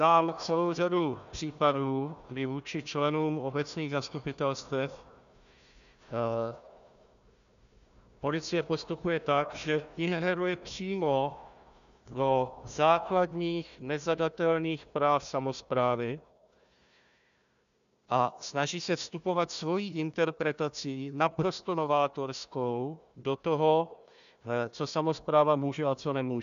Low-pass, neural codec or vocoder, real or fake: 7.2 kHz; codec, 16 kHz, 1 kbps, FunCodec, trained on Chinese and English, 50 frames a second; fake